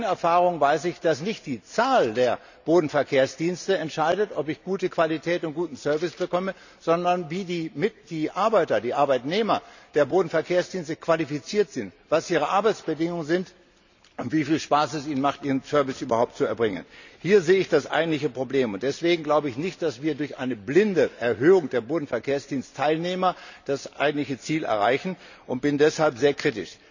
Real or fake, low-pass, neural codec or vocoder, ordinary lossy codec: real; 7.2 kHz; none; none